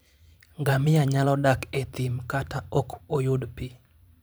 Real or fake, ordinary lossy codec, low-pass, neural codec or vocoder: fake; none; none; vocoder, 44.1 kHz, 128 mel bands every 512 samples, BigVGAN v2